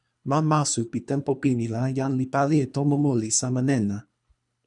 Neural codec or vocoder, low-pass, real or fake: codec, 24 kHz, 3 kbps, HILCodec; 10.8 kHz; fake